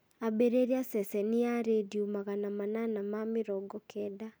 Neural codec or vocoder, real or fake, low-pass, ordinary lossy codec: none; real; none; none